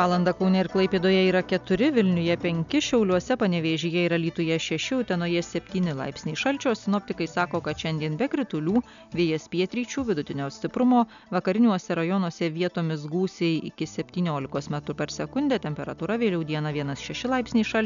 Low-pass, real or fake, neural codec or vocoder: 7.2 kHz; real; none